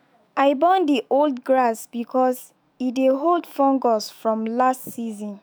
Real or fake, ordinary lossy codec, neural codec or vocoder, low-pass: fake; none; autoencoder, 48 kHz, 128 numbers a frame, DAC-VAE, trained on Japanese speech; none